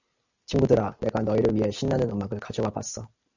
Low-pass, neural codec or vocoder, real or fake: 7.2 kHz; none; real